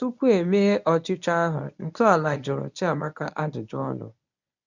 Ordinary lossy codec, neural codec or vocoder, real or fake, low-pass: none; codec, 24 kHz, 0.9 kbps, WavTokenizer, medium speech release version 1; fake; 7.2 kHz